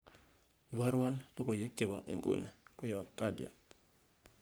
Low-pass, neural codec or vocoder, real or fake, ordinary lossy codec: none; codec, 44.1 kHz, 3.4 kbps, Pupu-Codec; fake; none